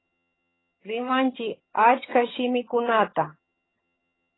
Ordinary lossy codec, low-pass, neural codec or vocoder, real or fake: AAC, 16 kbps; 7.2 kHz; vocoder, 22.05 kHz, 80 mel bands, HiFi-GAN; fake